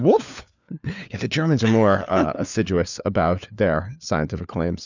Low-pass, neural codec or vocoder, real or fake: 7.2 kHz; codec, 16 kHz, 4 kbps, FunCodec, trained on LibriTTS, 50 frames a second; fake